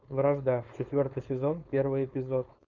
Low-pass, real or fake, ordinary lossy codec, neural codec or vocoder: 7.2 kHz; fake; Opus, 64 kbps; codec, 16 kHz, 4.8 kbps, FACodec